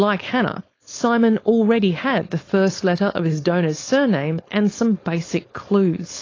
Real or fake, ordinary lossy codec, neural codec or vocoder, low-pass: fake; AAC, 32 kbps; codec, 16 kHz, 4.8 kbps, FACodec; 7.2 kHz